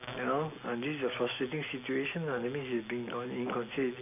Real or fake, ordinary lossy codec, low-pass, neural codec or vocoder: real; none; 3.6 kHz; none